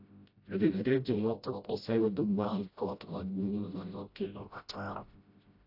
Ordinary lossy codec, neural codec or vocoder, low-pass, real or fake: AAC, 32 kbps; codec, 16 kHz, 0.5 kbps, FreqCodec, smaller model; 5.4 kHz; fake